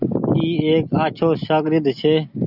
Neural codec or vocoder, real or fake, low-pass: none; real; 5.4 kHz